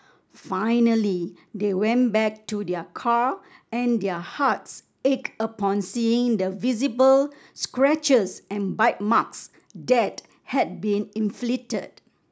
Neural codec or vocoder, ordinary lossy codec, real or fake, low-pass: none; none; real; none